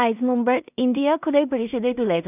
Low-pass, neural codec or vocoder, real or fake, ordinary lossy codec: 3.6 kHz; codec, 16 kHz in and 24 kHz out, 0.4 kbps, LongCat-Audio-Codec, two codebook decoder; fake; none